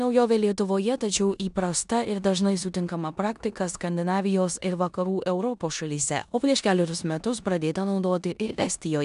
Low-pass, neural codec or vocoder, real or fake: 10.8 kHz; codec, 16 kHz in and 24 kHz out, 0.9 kbps, LongCat-Audio-Codec, four codebook decoder; fake